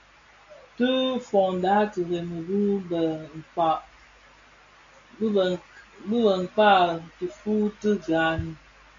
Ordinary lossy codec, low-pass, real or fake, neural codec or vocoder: AAC, 48 kbps; 7.2 kHz; real; none